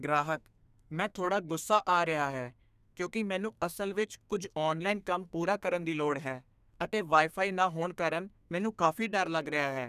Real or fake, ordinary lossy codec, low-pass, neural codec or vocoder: fake; none; 14.4 kHz; codec, 32 kHz, 1.9 kbps, SNAC